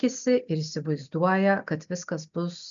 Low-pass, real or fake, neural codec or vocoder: 7.2 kHz; real; none